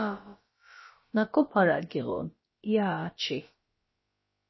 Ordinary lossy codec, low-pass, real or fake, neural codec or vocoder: MP3, 24 kbps; 7.2 kHz; fake; codec, 16 kHz, about 1 kbps, DyCAST, with the encoder's durations